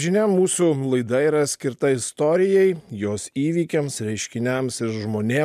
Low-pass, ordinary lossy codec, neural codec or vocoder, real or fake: 14.4 kHz; MP3, 96 kbps; none; real